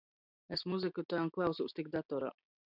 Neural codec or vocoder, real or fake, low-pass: none; real; 5.4 kHz